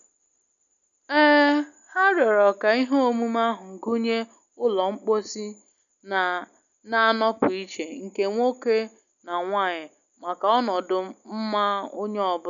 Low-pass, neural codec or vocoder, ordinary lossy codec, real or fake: 7.2 kHz; none; none; real